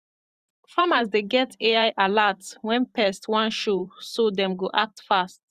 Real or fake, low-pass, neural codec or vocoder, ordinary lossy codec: fake; 14.4 kHz; vocoder, 44.1 kHz, 128 mel bands every 512 samples, BigVGAN v2; Opus, 64 kbps